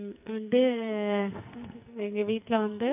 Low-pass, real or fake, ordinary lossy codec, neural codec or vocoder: 3.6 kHz; fake; none; codec, 44.1 kHz, 2.6 kbps, SNAC